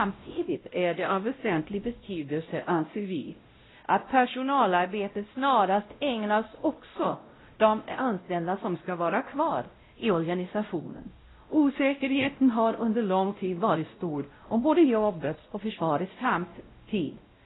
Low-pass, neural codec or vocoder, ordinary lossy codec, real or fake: 7.2 kHz; codec, 16 kHz, 0.5 kbps, X-Codec, WavLM features, trained on Multilingual LibriSpeech; AAC, 16 kbps; fake